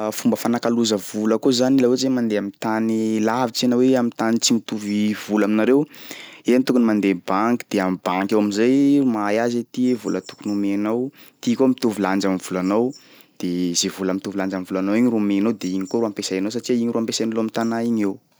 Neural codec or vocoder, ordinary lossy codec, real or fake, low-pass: none; none; real; none